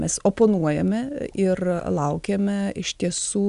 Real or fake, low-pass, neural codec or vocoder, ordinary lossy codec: real; 10.8 kHz; none; MP3, 96 kbps